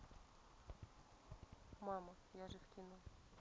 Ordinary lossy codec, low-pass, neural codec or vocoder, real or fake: none; none; none; real